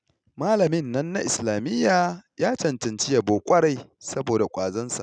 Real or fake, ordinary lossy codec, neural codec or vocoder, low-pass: real; none; none; 9.9 kHz